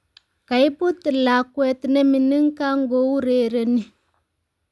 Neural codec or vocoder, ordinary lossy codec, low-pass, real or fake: none; none; none; real